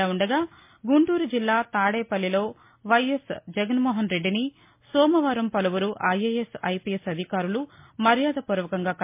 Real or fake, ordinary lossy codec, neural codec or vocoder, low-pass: real; MP3, 24 kbps; none; 3.6 kHz